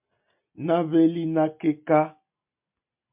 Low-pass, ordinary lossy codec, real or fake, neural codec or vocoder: 3.6 kHz; MP3, 32 kbps; real; none